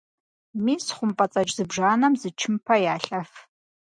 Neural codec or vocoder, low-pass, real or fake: none; 9.9 kHz; real